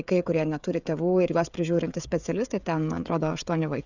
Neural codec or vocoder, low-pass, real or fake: codec, 16 kHz, 16 kbps, FreqCodec, smaller model; 7.2 kHz; fake